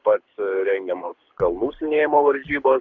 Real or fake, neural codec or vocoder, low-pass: fake; codec, 24 kHz, 6 kbps, HILCodec; 7.2 kHz